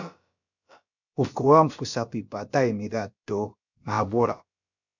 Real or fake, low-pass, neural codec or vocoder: fake; 7.2 kHz; codec, 16 kHz, about 1 kbps, DyCAST, with the encoder's durations